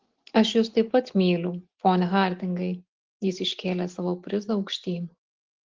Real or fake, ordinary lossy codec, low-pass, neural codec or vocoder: real; Opus, 16 kbps; 7.2 kHz; none